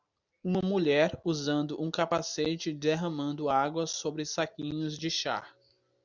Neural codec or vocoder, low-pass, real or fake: none; 7.2 kHz; real